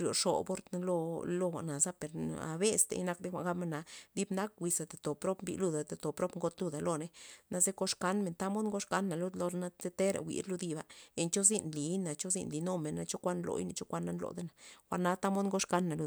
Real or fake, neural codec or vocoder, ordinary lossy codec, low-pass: real; none; none; none